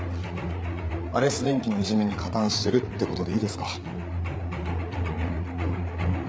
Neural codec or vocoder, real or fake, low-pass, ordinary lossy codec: codec, 16 kHz, 8 kbps, FreqCodec, larger model; fake; none; none